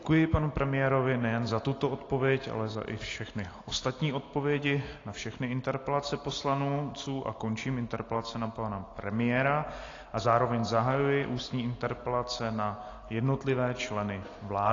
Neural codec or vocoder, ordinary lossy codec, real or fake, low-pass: none; AAC, 32 kbps; real; 7.2 kHz